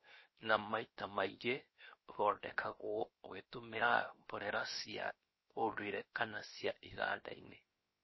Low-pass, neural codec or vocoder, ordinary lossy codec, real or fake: 7.2 kHz; codec, 16 kHz, 0.7 kbps, FocalCodec; MP3, 24 kbps; fake